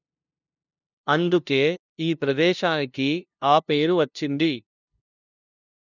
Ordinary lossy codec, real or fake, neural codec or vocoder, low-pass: none; fake; codec, 16 kHz, 0.5 kbps, FunCodec, trained on LibriTTS, 25 frames a second; 7.2 kHz